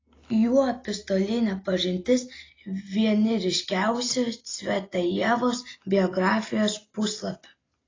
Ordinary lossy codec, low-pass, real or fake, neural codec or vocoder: AAC, 32 kbps; 7.2 kHz; real; none